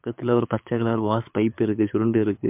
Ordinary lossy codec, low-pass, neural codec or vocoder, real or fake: MP3, 32 kbps; 3.6 kHz; codec, 24 kHz, 6 kbps, HILCodec; fake